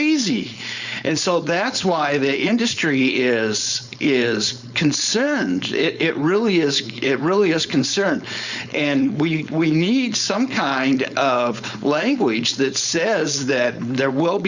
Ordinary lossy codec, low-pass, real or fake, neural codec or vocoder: Opus, 64 kbps; 7.2 kHz; fake; codec, 16 kHz, 4.8 kbps, FACodec